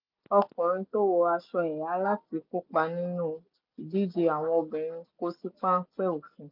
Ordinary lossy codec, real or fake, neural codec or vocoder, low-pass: MP3, 48 kbps; real; none; 5.4 kHz